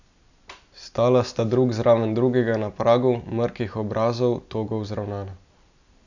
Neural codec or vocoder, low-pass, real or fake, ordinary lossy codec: none; 7.2 kHz; real; none